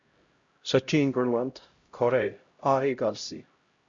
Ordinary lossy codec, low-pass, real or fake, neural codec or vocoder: Opus, 64 kbps; 7.2 kHz; fake; codec, 16 kHz, 0.5 kbps, X-Codec, HuBERT features, trained on LibriSpeech